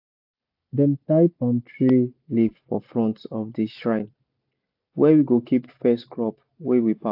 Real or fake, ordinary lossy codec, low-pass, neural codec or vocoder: real; AAC, 48 kbps; 5.4 kHz; none